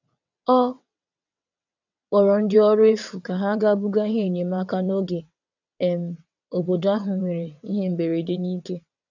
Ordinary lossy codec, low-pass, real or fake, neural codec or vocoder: none; 7.2 kHz; fake; vocoder, 22.05 kHz, 80 mel bands, WaveNeXt